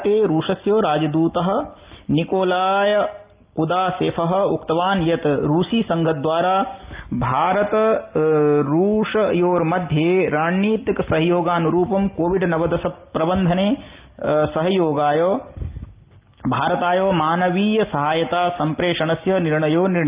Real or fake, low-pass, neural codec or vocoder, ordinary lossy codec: real; 3.6 kHz; none; Opus, 32 kbps